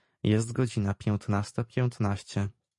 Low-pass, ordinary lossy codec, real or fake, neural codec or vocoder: 10.8 kHz; MP3, 48 kbps; fake; vocoder, 44.1 kHz, 128 mel bands every 512 samples, BigVGAN v2